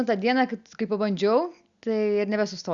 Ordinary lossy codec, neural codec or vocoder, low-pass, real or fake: Opus, 64 kbps; none; 7.2 kHz; real